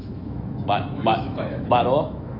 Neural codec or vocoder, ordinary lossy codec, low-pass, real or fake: autoencoder, 48 kHz, 128 numbers a frame, DAC-VAE, trained on Japanese speech; none; 5.4 kHz; fake